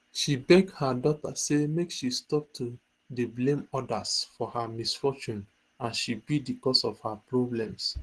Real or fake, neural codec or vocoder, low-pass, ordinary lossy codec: real; none; 10.8 kHz; Opus, 16 kbps